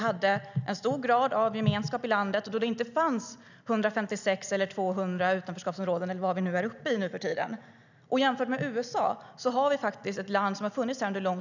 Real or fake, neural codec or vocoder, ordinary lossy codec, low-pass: real; none; none; 7.2 kHz